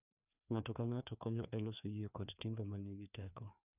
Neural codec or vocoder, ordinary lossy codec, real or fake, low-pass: codec, 16 kHz, 2 kbps, FreqCodec, larger model; none; fake; 3.6 kHz